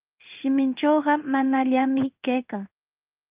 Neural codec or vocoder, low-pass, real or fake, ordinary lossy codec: codec, 16 kHz in and 24 kHz out, 1 kbps, XY-Tokenizer; 3.6 kHz; fake; Opus, 24 kbps